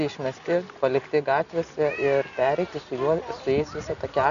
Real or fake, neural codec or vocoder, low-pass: real; none; 7.2 kHz